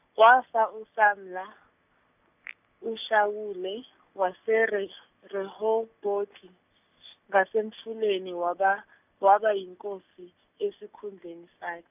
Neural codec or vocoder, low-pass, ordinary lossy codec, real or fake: none; 3.6 kHz; none; real